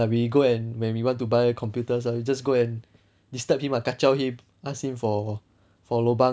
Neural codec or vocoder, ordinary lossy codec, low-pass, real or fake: none; none; none; real